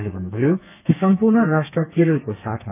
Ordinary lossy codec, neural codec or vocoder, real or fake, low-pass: none; codec, 32 kHz, 1.9 kbps, SNAC; fake; 3.6 kHz